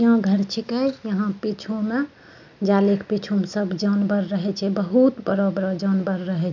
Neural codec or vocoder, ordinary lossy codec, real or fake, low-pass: none; none; real; 7.2 kHz